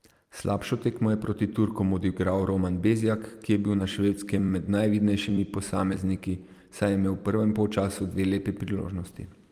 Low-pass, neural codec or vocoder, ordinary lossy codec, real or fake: 14.4 kHz; vocoder, 44.1 kHz, 128 mel bands every 256 samples, BigVGAN v2; Opus, 32 kbps; fake